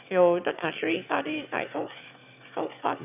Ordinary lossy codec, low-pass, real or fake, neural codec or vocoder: none; 3.6 kHz; fake; autoencoder, 22.05 kHz, a latent of 192 numbers a frame, VITS, trained on one speaker